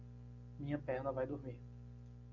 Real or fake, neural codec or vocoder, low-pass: real; none; 7.2 kHz